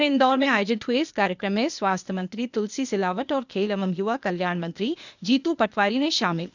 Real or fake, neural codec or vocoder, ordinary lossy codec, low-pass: fake; codec, 16 kHz, 0.8 kbps, ZipCodec; none; 7.2 kHz